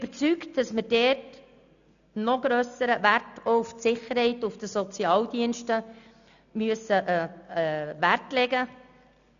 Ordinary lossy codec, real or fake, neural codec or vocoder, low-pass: none; real; none; 7.2 kHz